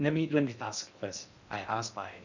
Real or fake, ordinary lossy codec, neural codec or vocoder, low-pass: fake; none; codec, 16 kHz in and 24 kHz out, 0.6 kbps, FocalCodec, streaming, 2048 codes; 7.2 kHz